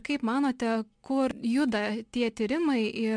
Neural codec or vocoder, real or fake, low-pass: none; real; 9.9 kHz